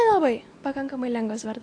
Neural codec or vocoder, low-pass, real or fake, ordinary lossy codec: none; 9.9 kHz; real; AAC, 48 kbps